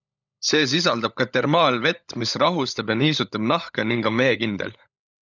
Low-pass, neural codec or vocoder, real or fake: 7.2 kHz; codec, 16 kHz, 16 kbps, FunCodec, trained on LibriTTS, 50 frames a second; fake